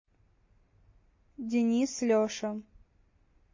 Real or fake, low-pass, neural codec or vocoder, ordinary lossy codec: real; 7.2 kHz; none; MP3, 32 kbps